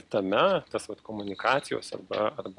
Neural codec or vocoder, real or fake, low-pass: none; real; 10.8 kHz